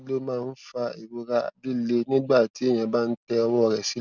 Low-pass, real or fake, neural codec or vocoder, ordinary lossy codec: 7.2 kHz; real; none; none